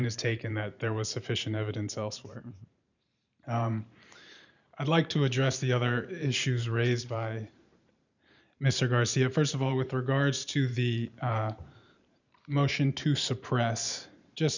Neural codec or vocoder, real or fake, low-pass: vocoder, 44.1 kHz, 128 mel bands every 512 samples, BigVGAN v2; fake; 7.2 kHz